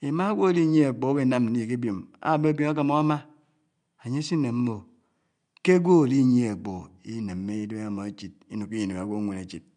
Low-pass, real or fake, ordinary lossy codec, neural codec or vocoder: 9.9 kHz; real; MP3, 64 kbps; none